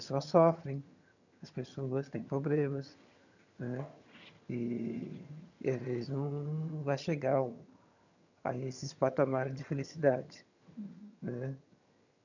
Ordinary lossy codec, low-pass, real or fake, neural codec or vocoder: none; 7.2 kHz; fake; vocoder, 22.05 kHz, 80 mel bands, HiFi-GAN